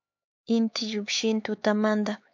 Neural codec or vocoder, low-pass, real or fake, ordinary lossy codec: codec, 16 kHz, 4 kbps, X-Codec, HuBERT features, trained on LibriSpeech; 7.2 kHz; fake; MP3, 64 kbps